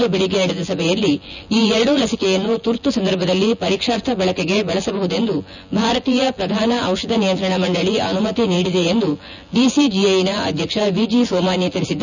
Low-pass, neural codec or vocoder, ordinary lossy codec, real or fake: 7.2 kHz; vocoder, 24 kHz, 100 mel bands, Vocos; none; fake